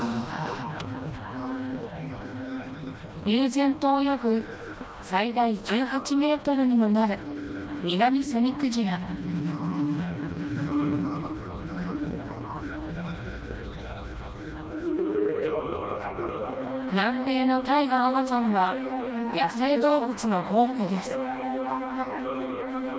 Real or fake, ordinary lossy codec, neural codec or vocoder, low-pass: fake; none; codec, 16 kHz, 1 kbps, FreqCodec, smaller model; none